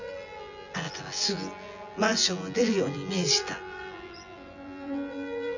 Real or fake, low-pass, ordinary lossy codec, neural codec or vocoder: fake; 7.2 kHz; none; vocoder, 24 kHz, 100 mel bands, Vocos